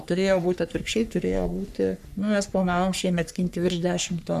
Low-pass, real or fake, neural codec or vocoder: 14.4 kHz; fake; codec, 44.1 kHz, 3.4 kbps, Pupu-Codec